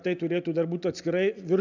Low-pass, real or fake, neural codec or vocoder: 7.2 kHz; real; none